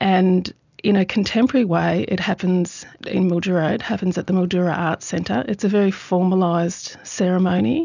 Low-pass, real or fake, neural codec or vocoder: 7.2 kHz; real; none